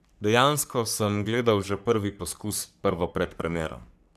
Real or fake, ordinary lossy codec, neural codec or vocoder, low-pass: fake; none; codec, 44.1 kHz, 3.4 kbps, Pupu-Codec; 14.4 kHz